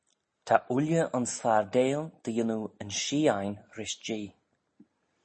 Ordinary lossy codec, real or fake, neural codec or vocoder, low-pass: MP3, 32 kbps; real; none; 9.9 kHz